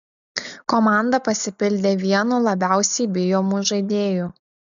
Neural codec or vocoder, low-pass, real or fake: none; 7.2 kHz; real